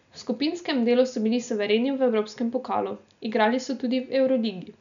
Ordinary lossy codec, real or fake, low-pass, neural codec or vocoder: none; real; 7.2 kHz; none